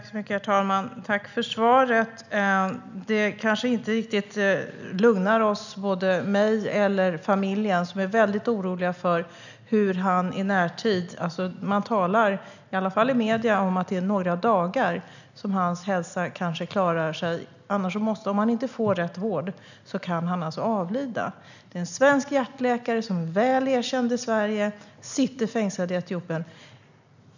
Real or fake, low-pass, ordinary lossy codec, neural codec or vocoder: real; 7.2 kHz; none; none